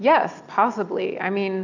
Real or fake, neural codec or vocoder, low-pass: real; none; 7.2 kHz